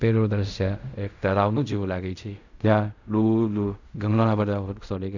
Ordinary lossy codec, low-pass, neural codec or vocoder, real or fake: none; 7.2 kHz; codec, 16 kHz in and 24 kHz out, 0.4 kbps, LongCat-Audio-Codec, fine tuned four codebook decoder; fake